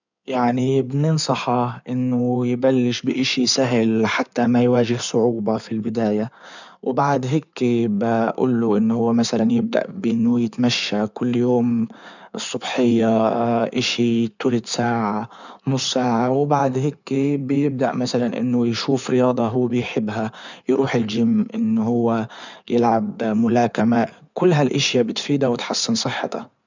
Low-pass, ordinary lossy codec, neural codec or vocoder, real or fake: 7.2 kHz; none; codec, 16 kHz in and 24 kHz out, 2.2 kbps, FireRedTTS-2 codec; fake